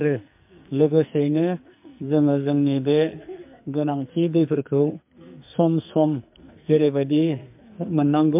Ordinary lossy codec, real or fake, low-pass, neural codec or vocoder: MP3, 32 kbps; fake; 3.6 kHz; codec, 44.1 kHz, 2.6 kbps, SNAC